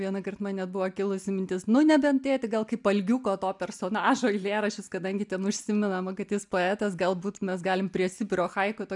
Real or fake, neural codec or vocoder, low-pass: real; none; 10.8 kHz